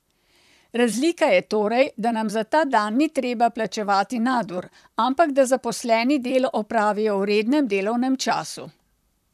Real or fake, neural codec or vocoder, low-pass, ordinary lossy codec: fake; vocoder, 44.1 kHz, 128 mel bands, Pupu-Vocoder; 14.4 kHz; none